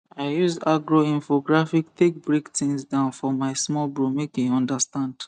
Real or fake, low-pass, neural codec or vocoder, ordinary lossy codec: real; 9.9 kHz; none; none